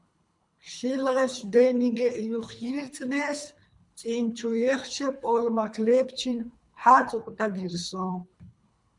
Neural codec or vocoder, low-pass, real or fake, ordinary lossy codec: codec, 24 kHz, 3 kbps, HILCodec; 10.8 kHz; fake; MP3, 96 kbps